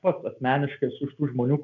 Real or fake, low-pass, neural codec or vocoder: real; 7.2 kHz; none